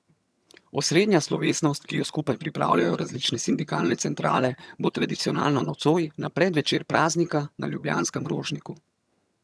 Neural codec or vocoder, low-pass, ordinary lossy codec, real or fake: vocoder, 22.05 kHz, 80 mel bands, HiFi-GAN; none; none; fake